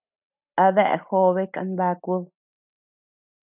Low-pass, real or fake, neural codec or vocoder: 3.6 kHz; real; none